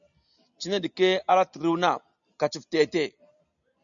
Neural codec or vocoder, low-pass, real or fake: none; 7.2 kHz; real